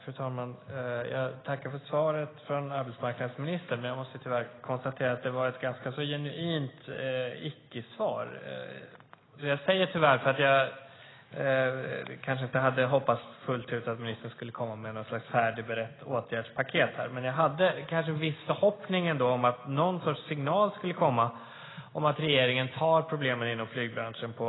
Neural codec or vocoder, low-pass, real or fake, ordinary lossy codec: none; 7.2 kHz; real; AAC, 16 kbps